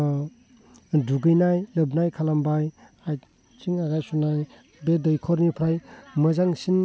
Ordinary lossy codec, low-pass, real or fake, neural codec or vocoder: none; none; real; none